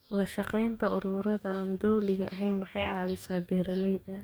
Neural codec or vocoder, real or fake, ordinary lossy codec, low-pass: codec, 44.1 kHz, 2.6 kbps, DAC; fake; none; none